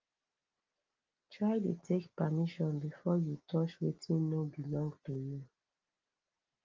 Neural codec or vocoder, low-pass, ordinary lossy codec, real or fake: none; 7.2 kHz; Opus, 32 kbps; real